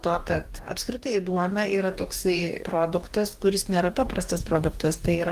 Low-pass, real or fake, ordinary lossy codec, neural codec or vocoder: 14.4 kHz; fake; Opus, 24 kbps; codec, 44.1 kHz, 2.6 kbps, DAC